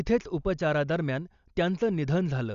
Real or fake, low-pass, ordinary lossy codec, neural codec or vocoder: real; 7.2 kHz; Opus, 64 kbps; none